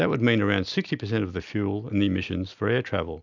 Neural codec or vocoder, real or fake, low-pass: none; real; 7.2 kHz